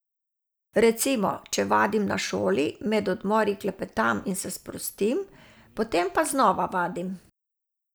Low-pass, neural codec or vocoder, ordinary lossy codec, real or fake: none; none; none; real